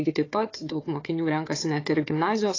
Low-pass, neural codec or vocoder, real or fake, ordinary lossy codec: 7.2 kHz; codec, 16 kHz, 4 kbps, FunCodec, trained on Chinese and English, 50 frames a second; fake; AAC, 32 kbps